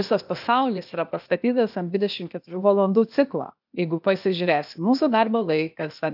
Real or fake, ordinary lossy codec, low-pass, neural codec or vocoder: fake; AAC, 48 kbps; 5.4 kHz; codec, 16 kHz, 0.8 kbps, ZipCodec